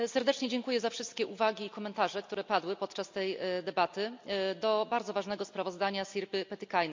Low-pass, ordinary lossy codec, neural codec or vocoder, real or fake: 7.2 kHz; MP3, 64 kbps; none; real